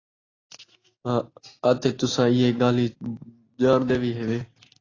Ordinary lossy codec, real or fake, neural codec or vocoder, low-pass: AAC, 32 kbps; real; none; 7.2 kHz